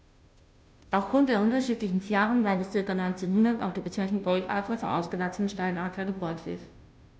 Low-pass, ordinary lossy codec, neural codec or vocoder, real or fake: none; none; codec, 16 kHz, 0.5 kbps, FunCodec, trained on Chinese and English, 25 frames a second; fake